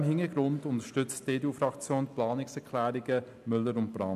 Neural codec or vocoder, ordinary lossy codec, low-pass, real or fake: none; none; 14.4 kHz; real